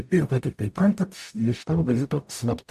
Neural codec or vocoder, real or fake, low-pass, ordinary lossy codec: codec, 44.1 kHz, 0.9 kbps, DAC; fake; 14.4 kHz; AAC, 96 kbps